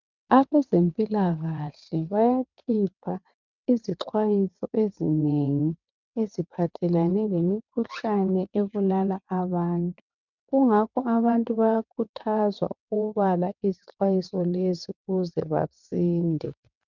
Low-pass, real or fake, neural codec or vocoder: 7.2 kHz; fake; vocoder, 22.05 kHz, 80 mel bands, WaveNeXt